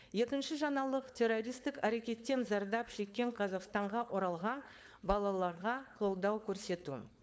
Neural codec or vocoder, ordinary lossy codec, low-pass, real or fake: codec, 16 kHz, 4.8 kbps, FACodec; none; none; fake